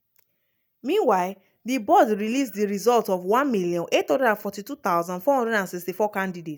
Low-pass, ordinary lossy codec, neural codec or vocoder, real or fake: none; none; none; real